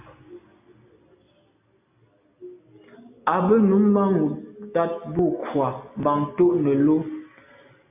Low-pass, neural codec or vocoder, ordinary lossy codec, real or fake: 3.6 kHz; none; AAC, 32 kbps; real